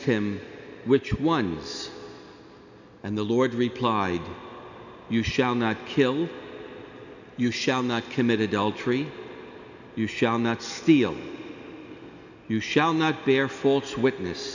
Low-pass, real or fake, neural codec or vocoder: 7.2 kHz; real; none